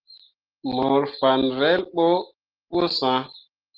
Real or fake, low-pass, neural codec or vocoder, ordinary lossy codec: real; 5.4 kHz; none; Opus, 16 kbps